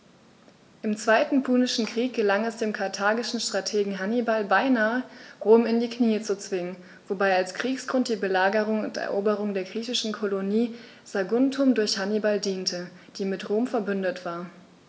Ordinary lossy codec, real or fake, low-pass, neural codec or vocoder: none; real; none; none